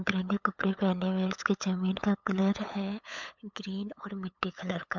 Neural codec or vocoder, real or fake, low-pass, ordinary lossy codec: codec, 16 kHz, 8 kbps, FunCodec, trained on LibriTTS, 25 frames a second; fake; 7.2 kHz; MP3, 64 kbps